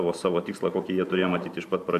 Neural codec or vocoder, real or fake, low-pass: vocoder, 44.1 kHz, 128 mel bands every 512 samples, BigVGAN v2; fake; 14.4 kHz